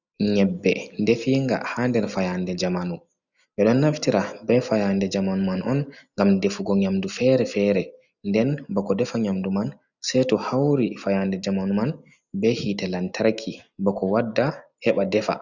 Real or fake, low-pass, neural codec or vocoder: real; 7.2 kHz; none